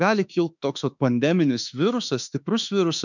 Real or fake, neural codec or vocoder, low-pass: fake; autoencoder, 48 kHz, 32 numbers a frame, DAC-VAE, trained on Japanese speech; 7.2 kHz